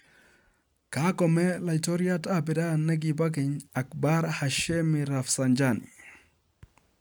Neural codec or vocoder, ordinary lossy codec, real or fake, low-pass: none; none; real; none